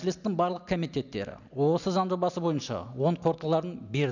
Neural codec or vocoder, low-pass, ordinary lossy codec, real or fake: none; 7.2 kHz; none; real